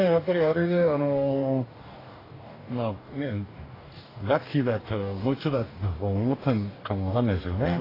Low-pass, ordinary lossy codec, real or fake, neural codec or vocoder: 5.4 kHz; AAC, 24 kbps; fake; codec, 44.1 kHz, 2.6 kbps, DAC